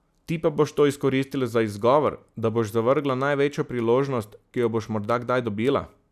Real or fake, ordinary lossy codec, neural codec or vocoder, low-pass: real; none; none; 14.4 kHz